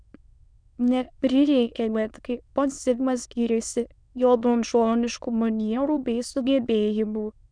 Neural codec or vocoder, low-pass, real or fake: autoencoder, 22.05 kHz, a latent of 192 numbers a frame, VITS, trained on many speakers; 9.9 kHz; fake